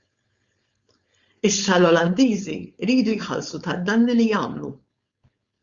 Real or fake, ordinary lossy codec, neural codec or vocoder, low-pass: fake; Opus, 64 kbps; codec, 16 kHz, 4.8 kbps, FACodec; 7.2 kHz